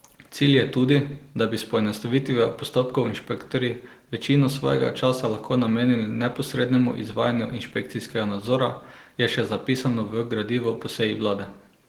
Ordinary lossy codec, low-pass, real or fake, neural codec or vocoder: Opus, 16 kbps; 19.8 kHz; real; none